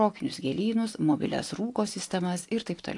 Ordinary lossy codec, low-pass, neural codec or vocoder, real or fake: AAC, 48 kbps; 10.8 kHz; none; real